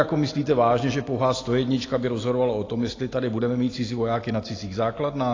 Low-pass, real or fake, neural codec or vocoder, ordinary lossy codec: 7.2 kHz; real; none; AAC, 32 kbps